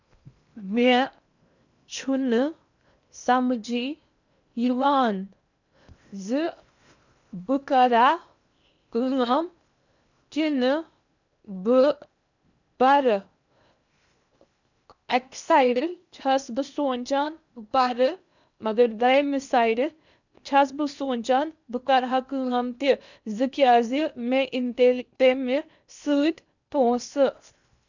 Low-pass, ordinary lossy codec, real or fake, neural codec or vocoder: 7.2 kHz; none; fake; codec, 16 kHz in and 24 kHz out, 0.8 kbps, FocalCodec, streaming, 65536 codes